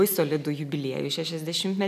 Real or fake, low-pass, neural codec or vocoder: real; 14.4 kHz; none